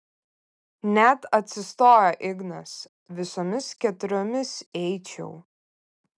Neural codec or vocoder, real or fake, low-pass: none; real; 9.9 kHz